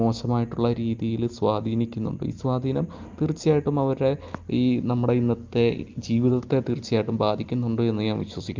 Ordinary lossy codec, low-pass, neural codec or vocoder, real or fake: Opus, 24 kbps; 7.2 kHz; none; real